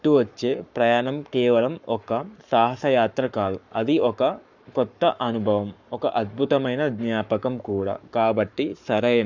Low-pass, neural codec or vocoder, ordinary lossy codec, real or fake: 7.2 kHz; codec, 44.1 kHz, 7.8 kbps, Pupu-Codec; none; fake